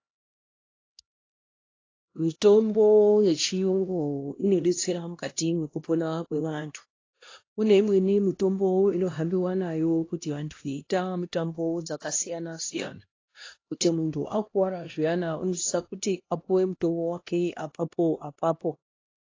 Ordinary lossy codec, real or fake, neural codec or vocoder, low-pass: AAC, 32 kbps; fake; codec, 16 kHz, 1 kbps, X-Codec, HuBERT features, trained on LibriSpeech; 7.2 kHz